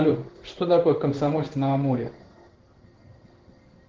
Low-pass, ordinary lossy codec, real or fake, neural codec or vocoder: 7.2 kHz; Opus, 16 kbps; fake; codec, 16 kHz in and 24 kHz out, 1 kbps, XY-Tokenizer